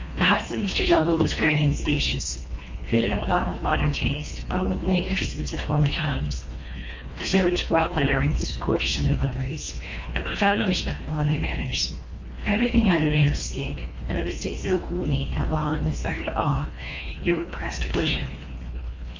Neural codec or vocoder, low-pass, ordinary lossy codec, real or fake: codec, 24 kHz, 1.5 kbps, HILCodec; 7.2 kHz; MP3, 48 kbps; fake